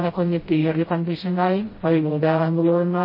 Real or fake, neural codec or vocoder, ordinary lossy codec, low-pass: fake; codec, 16 kHz, 0.5 kbps, FreqCodec, smaller model; MP3, 24 kbps; 5.4 kHz